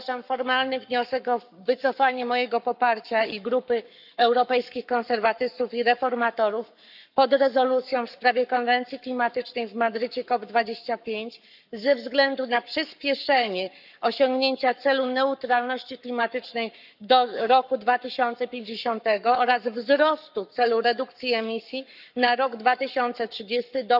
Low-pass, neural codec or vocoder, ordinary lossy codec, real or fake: 5.4 kHz; codec, 44.1 kHz, 7.8 kbps, Pupu-Codec; none; fake